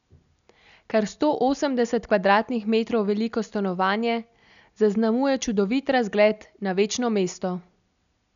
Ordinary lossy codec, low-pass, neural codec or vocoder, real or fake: none; 7.2 kHz; none; real